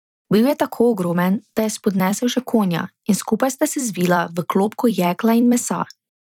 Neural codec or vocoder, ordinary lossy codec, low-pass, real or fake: vocoder, 44.1 kHz, 128 mel bands every 256 samples, BigVGAN v2; none; 19.8 kHz; fake